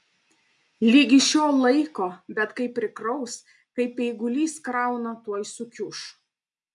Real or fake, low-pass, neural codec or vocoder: real; 10.8 kHz; none